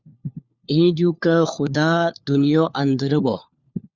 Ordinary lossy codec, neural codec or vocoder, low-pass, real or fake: Opus, 64 kbps; codec, 16 kHz, 4 kbps, FunCodec, trained on LibriTTS, 50 frames a second; 7.2 kHz; fake